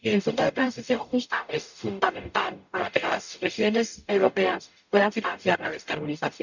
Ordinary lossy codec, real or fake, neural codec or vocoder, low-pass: none; fake; codec, 44.1 kHz, 0.9 kbps, DAC; 7.2 kHz